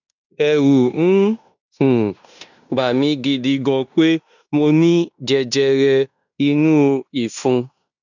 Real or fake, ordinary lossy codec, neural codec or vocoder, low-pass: fake; none; codec, 16 kHz in and 24 kHz out, 0.9 kbps, LongCat-Audio-Codec, fine tuned four codebook decoder; 7.2 kHz